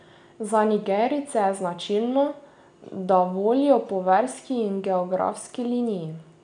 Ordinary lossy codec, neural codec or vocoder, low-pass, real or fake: none; none; 9.9 kHz; real